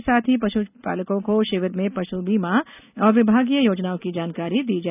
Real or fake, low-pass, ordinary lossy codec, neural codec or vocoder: real; 3.6 kHz; none; none